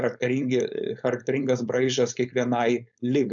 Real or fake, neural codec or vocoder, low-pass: fake; codec, 16 kHz, 4.8 kbps, FACodec; 7.2 kHz